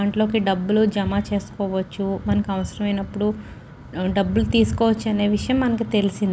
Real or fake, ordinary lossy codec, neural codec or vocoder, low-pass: real; none; none; none